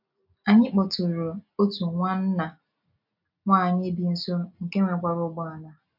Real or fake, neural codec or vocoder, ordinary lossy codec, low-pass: real; none; AAC, 48 kbps; 5.4 kHz